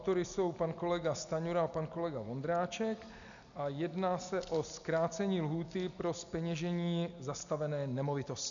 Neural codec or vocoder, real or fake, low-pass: none; real; 7.2 kHz